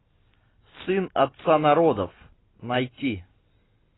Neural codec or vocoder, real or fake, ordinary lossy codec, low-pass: none; real; AAC, 16 kbps; 7.2 kHz